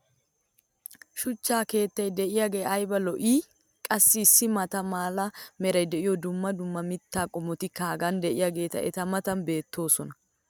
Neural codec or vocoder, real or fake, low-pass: none; real; 19.8 kHz